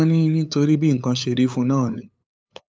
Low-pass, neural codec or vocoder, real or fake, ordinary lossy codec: none; codec, 16 kHz, 8 kbps, FunCodec, trained on LibriTTS, 25 frames a second; fake; none